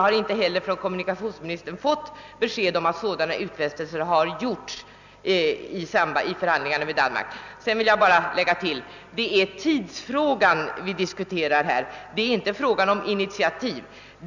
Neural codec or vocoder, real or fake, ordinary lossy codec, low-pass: none; real; none; 7.2 kHz